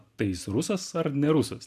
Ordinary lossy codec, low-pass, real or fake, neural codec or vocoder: AAC, 96 kbps; 14.4 kHz; real; none